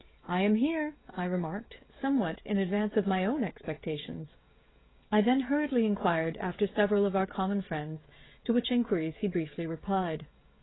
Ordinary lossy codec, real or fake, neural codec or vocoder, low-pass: AAC, 16 kbps; fake; codec, 16 kHz, 16 kbps, FreqCodec, smaller model; 7.2 kHz